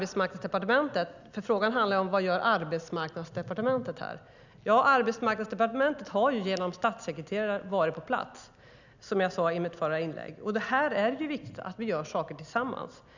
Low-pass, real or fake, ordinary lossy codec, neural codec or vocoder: 7.2 kHz; real; none; none